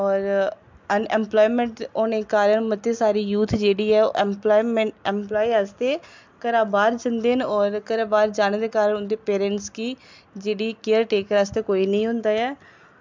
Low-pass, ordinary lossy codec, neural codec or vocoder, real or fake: 7.2 kHz; MP3, 64 kbps; none; real